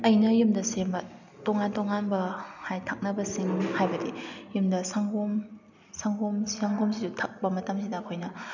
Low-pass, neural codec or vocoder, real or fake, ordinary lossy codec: 7.2 kHz; none; real; none